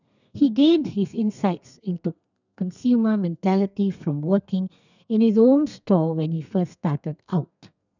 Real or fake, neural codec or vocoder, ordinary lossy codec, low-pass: fake; codec, 32 kHz, 1.9 kbps, SNAC; none; 7.2 kHz